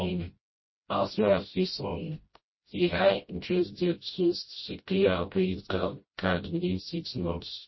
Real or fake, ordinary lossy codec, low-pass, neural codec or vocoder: fake; MP3, 24 kbps; 7.2 kHz; codec, 16 kHz, 0.5 kbps, FreqCodec, smaller model